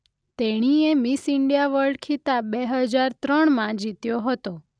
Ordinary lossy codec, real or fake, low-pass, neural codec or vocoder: none; real; 9.9 kHz; none